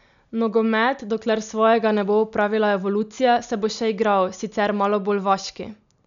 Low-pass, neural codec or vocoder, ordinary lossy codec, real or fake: 7.2 kHz; none; none; real